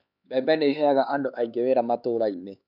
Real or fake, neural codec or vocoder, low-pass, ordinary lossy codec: fake; codec, 16 kHz, 4 kbps, X-Codec, HuBERT features, trained on LibriSpeech; 5.4 kHz; none